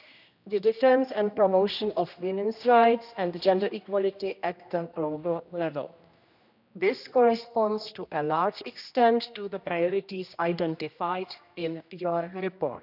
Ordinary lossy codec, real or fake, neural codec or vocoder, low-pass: none; fake; codec, 16 kHz, 1 kbps, X-Codec, HuBERT features, trained on general audio; 5.4 kHz